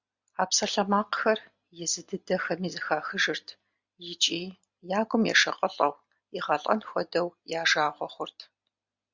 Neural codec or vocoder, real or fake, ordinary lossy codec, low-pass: none; real; Opus, 64 kbps; 7.2 kHz